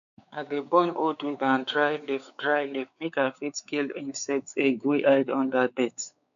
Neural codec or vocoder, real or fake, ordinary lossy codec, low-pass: codec, 16 kHz, 4 kbps, X-Codec, WavLM features, trained on Multilingual LibriSpeech; fake; none; 7.2 kHz